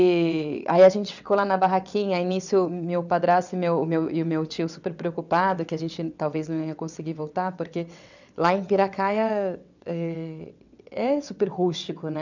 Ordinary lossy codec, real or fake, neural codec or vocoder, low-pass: none; fake; vocoder, 22.05 kHz, 80 mel bands, WaveNeXt; 7.2 kHz